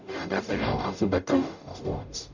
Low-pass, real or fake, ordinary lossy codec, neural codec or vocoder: 7.2 kHz; fake; none; codec, 44.1 kHz, 0.9 kbps, DAC